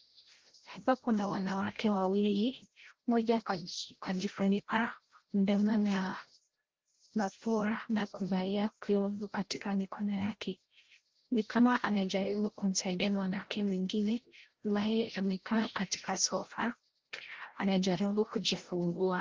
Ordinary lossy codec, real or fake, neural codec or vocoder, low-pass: Opus, 16 kbps; fake; codec, 16 kHz, 0.5 kbps, FreqCodec, larger model; 7.2 kHz